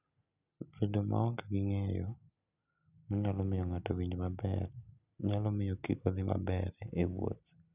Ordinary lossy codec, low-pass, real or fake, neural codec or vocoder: none; 3.6 kHz; real; none